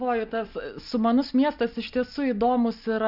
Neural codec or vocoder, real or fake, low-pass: none; real; 5.4 kHz